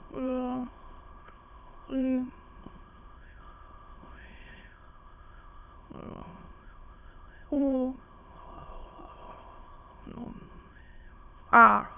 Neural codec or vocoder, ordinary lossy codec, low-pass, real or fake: autoencoder, 22.05 kHz, a latent of 192 numbers a frame, VITS, trained on many speakers; none; 3.6 kHz; fake